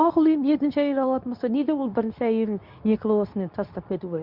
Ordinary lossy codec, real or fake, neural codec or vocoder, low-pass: none; fake; codec, 24 kHz, 0.9 kbps, WavTokenizer, medium speech release version 2; 5.4 kHz